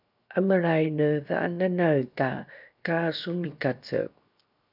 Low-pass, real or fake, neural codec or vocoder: 5.4 kHz; fake; codec, 16 kHz, 0.7 kbps, FocalCodec